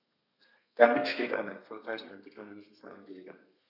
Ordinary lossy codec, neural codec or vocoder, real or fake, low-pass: none; codec, 32 kHz, 1.9 kbps, SNAC; fake; 5.4 kHz